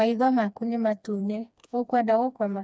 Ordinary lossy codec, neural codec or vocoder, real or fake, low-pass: none; codec, 16 kHz, 2 kbps, FreqCodec, smaller model; fake; none